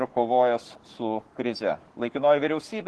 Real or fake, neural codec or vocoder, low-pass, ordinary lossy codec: fake; codec, 44.1 kHz, 7.8 kbps, Pupu-Codec; 10.8 kHz; Opus, 16 kbps